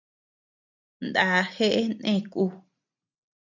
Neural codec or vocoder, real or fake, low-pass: none; real; 7.2 kHz